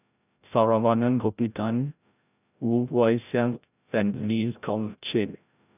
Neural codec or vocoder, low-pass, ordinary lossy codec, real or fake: codec, 16 kHz, 0.5 kbps, FreqCodec, larger model; 3.6 kHz; none; fake